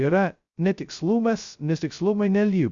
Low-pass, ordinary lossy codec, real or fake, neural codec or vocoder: 7.2 kHz; Opus, 64 kbps; fake; codec, 16 kHz, 0.2 kbps, FocalCodec